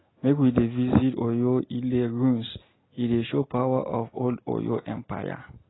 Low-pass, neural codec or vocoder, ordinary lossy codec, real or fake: 7.2 kHz; none; AAC, 16 kbps; real